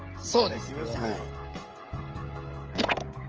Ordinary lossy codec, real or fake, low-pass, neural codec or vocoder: Opus, 24 kbps; fake; 7.2 kHz; codec, 16 kHz, 16 kbps, FreqCodec, smaller model